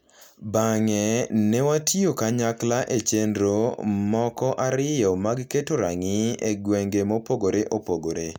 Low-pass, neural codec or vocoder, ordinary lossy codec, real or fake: 19.8 kHz; none; none; real